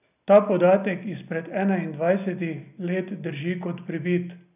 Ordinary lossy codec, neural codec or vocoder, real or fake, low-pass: none; none; real; 3.6 kHz